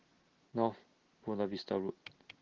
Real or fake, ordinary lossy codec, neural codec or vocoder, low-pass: real; Opus, 16 kbps; none; 7.2 kHz